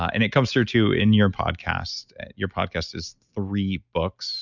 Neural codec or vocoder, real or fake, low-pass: none; real; 7.2 kHz